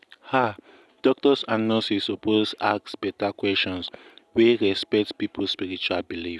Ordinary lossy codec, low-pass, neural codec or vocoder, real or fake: none; none; none; real